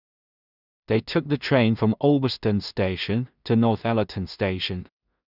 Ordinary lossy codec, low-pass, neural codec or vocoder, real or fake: none; 5.4 kHz; codec, 16 kHz in and 24 kHz out, 0.4 kbps, LongCat-Audio-Codec, two codebook decoder; fake